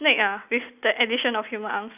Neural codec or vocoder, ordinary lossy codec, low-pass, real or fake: none; none; 3.6 kHz; real